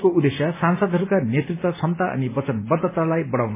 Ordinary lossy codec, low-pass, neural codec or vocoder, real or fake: MP3, 16 kbps; 3.6 kHz; none; real